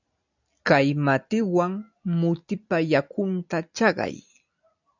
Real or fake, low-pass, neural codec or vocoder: real; 7.2 kHz; none